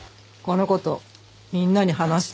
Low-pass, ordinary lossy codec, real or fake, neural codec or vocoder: none; none; real; none